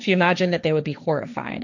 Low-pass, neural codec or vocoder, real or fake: 7.2 kHz; codec, 16 kHz, 1.1 kbps, Voila-Tokenizer; fake